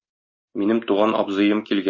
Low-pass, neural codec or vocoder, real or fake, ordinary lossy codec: 7.2 kHz; none; real; MP3, 32 kbps